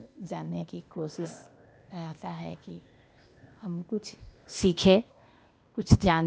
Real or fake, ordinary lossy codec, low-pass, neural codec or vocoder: fake; none; none; codec, 16 kHz, 0.8 kbps, ZipCodec